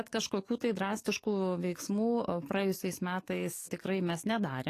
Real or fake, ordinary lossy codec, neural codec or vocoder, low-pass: fake; AAC, 48 kbps; codec, 44.1 kHz, 7.8 kbps, DAC; 14.4 kHz